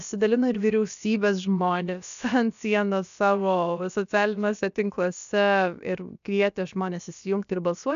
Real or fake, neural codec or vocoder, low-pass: fake; codec, 16 kHz, about 1 kbps, DyCAST, with the encoder's durations; 7.2 kHz